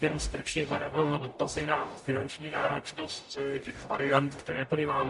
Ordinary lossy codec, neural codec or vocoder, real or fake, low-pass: MP3, 48 kbps; codec, 44.1 kHz, 0.9 kbps, DAC; fake; 14.4 kHz